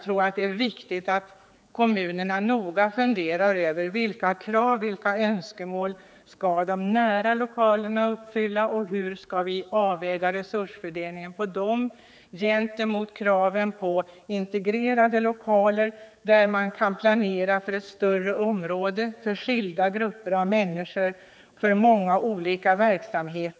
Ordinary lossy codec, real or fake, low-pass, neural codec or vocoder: none; fake; none; codec, 16 kHz, 4 kbps, X-Codec, HuBERT features, trained on general audio